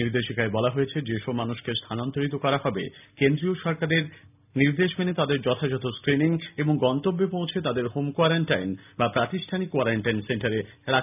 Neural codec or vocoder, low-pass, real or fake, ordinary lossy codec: none; 3.6 kHz; real; none